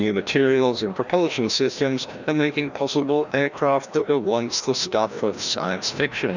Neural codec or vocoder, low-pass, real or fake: codec, 16 kHz, 1 kbps, FreqCodec, larger model; 7.2 kHz; fake